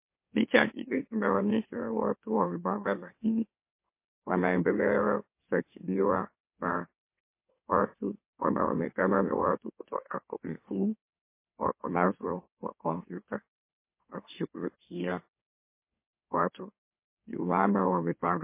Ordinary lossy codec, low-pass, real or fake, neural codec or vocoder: MP3, 24 kbps; 3.6 kHz; fake; autoencoder, 44.1 kHz, a latent of 192 numbers a frame, MeloTTS